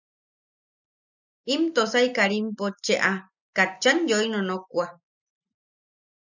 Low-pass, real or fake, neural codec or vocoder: 7.2 kHz; real; none